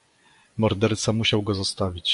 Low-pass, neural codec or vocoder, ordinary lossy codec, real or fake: 10.8 kHz; vocoder, 24 kHz, 100 mel bands, Vocos; AAC, 96 kbps; fake